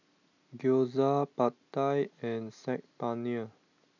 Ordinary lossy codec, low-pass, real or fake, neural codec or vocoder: none; 7.2 kHz; real; none